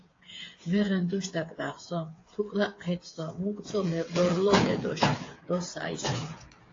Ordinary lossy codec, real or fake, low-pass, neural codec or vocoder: AAC, 32 kbps; fake; 7.2 kHz; codec, 16 kHz, 8 kbps, FreqCodec, smaller model